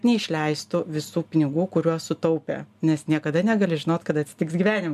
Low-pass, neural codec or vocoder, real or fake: 14.4 kHz; none; real